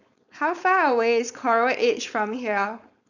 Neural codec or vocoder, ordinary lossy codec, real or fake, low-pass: codec, 16 kHz, 4.8 kbps, FACodec; none; fake; 7.2 kHz